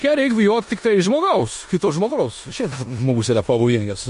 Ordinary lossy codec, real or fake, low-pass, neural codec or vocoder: MP3, 48 kbps; fake; 10.8 kHz; codec, 16 kHz in and 24 kHz out, 0.9 kbps, LongCat-Audio-Codec, four codebook decoder